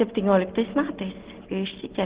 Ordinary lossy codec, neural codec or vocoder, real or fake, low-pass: Opus, 16 kbps; none; real; 3.6 kHz